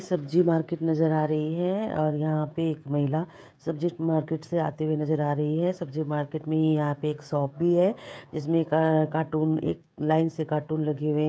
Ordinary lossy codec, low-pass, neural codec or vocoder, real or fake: none; none; codec, 16 kHz, 16 kbps, FreqCodec, smaller model; fake